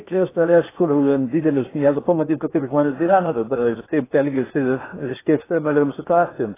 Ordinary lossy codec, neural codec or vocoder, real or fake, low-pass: AAC, 16 kbps; codec, 16 kHz in and 24 kHz out, 0.8 kbps, FocalCodec, streaming, 65536 codes; fake; 3.6 kHz